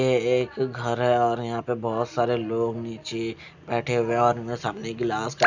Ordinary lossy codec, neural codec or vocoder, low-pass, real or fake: none; none; 7.2 kHz; real